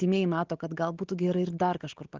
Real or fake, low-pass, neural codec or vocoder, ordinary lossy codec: real; 7.2 kHz; none; Opus, 16 kbps